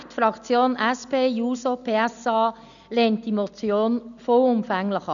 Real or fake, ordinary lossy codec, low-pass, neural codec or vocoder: real; none; 7.2 kHz; none